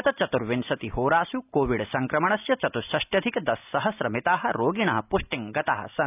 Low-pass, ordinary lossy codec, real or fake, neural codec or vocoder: 3.6 kHz; none; real; none